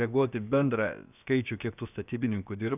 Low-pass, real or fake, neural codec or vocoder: 3.6 kHz; fake; codec, 16 kHz, about 1 kbps, DyCAST, with the encoder's durations